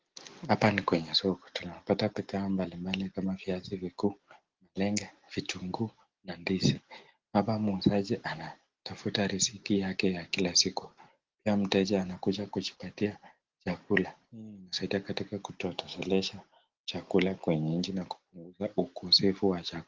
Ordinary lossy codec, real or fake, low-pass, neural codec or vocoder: Opus, 16 kbps; real; 7.2 kHz; none